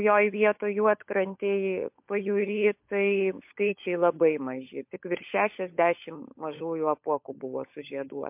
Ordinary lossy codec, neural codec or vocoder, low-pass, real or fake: MP3, 32 kbps; codec, 16 kHz, 16 kbps, FunCodec, trained on LibriTTS, 50 frames a second; 3.6 kHz; fake